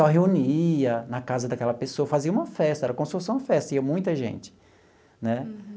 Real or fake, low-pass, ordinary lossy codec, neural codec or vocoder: real; none; none; none